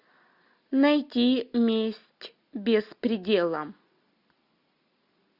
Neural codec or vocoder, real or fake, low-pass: none; real; 5.4 kHz